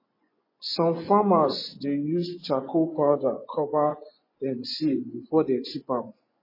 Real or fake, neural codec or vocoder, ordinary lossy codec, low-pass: fake; vocoder, 24 kHz, 100 mel bands, Vocos; MP3, 24 kbps; 5.4 kHz